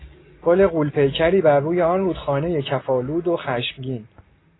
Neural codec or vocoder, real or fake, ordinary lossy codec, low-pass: none; real; AAC, 16 kbps; 7.2 kHz